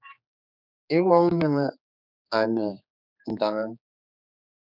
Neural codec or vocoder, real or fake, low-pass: codec, 16 kHz, 4 kbps, X-Codec, HuBERT features, trained on general audio; fake; 5.4 kHz